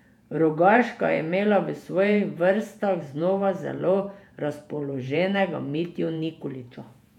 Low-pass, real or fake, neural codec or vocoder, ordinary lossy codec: 19.8 kHz; fake; vocoder, 48 kHz, 128 mel bands, Vocos; none